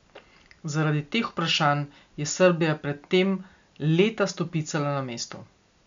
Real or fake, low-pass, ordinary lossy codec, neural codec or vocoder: real; 7.2 kHz; MP3, 64 kbps; none